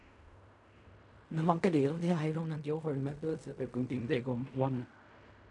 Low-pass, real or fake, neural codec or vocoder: 10.8 kHz; fake; codec, 16 kHz in and 24 kHz out, 0.4 kbps, LongCat-Audio-Codec, fine tuned four codebook decoder